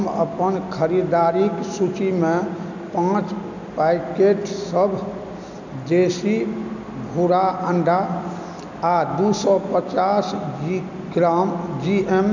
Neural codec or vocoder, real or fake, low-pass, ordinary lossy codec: none; real; 7.2 kHz; none